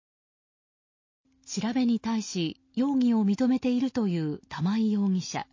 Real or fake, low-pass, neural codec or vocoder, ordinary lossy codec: real; 7.2 kHz; none; MP3, 32 kbps